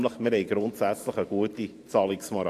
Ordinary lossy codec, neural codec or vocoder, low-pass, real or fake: AAC, 64 kbps; vocoder, 44.1 kHz, 128 mel bands every 512 samples, BigVGAN v2; 14.4 kHz; fake